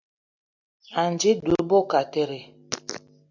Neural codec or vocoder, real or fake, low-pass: none; real; 7.2 kHz